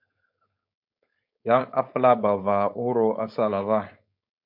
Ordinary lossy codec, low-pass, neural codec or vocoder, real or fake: MP3, 48 kbps; 5.4 kHz; codec, 16 kHz, 4.8 kbps, FACodec; fake